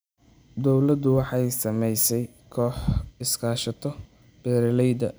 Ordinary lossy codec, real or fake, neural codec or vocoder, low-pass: none; real; none; none